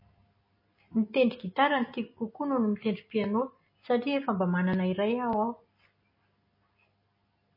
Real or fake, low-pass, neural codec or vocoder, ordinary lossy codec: real; 5.4 kHz; none; MP3, 24 kbps